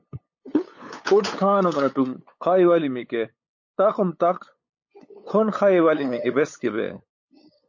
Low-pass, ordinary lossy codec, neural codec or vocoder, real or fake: 7.2 kHz; MP3, 32 kbps; codec, 16 kHz, 8 kbps, FunCodec, trained on LibriTTS, 25 frames a second; fake